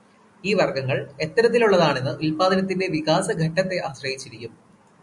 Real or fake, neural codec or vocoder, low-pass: real; none; 10.8 kHz